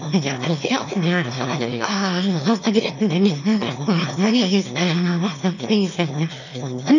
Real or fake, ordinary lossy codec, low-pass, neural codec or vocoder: fake; none; 7.2 kHz; autoencoder, 22.05 kHz, a latent of 192 numbers a frame, VITS, trained on one speaker